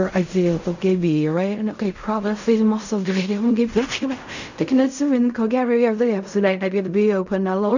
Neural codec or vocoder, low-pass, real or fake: codec, 16 kHz in and 24 kHz out, 0.4 kbps, LongCat-Audio-Codec, fine tuned four codebook decoder; 7.2 kHz; fake